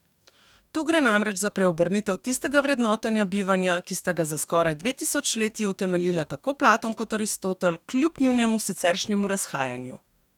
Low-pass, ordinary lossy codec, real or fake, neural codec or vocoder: 19.8 kHz; none; fake; codec, 44.1 kHz, 2.6 kbps, DAC